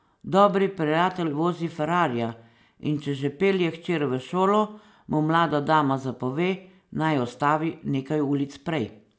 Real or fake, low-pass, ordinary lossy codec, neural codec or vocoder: real; none; none; none